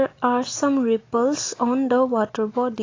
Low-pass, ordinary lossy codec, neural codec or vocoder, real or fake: 7.2 kHz; AAC, 32 kbps; none; real